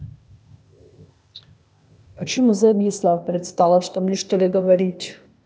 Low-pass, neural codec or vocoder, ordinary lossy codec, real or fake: none; codec, 16 kHz, 0.8 kbps, ZipCodec; none; fake